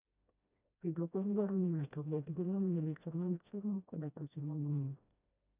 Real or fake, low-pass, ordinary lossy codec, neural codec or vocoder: fake; 3.6 kHz; none; codec, 16 kHz, 1 kbps, FreqCodec, smaller model